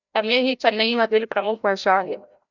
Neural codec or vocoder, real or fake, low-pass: codec, 16 kHz, 0.5 kbps, FreqCodec, larger model; fake; 7.2 kHz